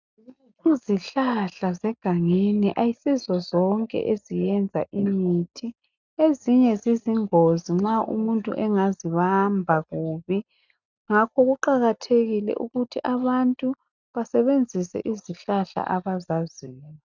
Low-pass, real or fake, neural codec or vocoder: 7.2 kHz; real; none